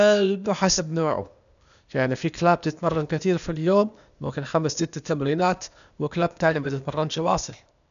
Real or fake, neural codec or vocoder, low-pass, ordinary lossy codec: fake; codec, 16 kHz, 0.8 kbps, ZipCodec; 7.2 kHz; MP3, 96 kbps